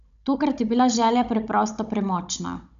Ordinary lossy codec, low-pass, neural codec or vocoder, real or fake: none; 7.2 kHz; codec, 16 kHz, 16 kbps, FunCodec, trained on Chinese and English, 50 frames a second; fake